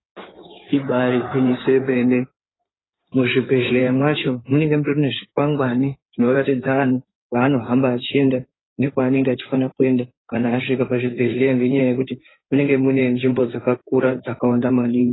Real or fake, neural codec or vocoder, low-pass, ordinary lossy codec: fake; codec, 16 kHz in and 24 kHz out, 2.2 kbps, FireRedTTS-2 codec; 7.2 kHz; AAC, 16 kbps